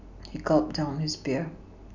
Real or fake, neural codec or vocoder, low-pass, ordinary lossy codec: real; none; 7.2 kHz; none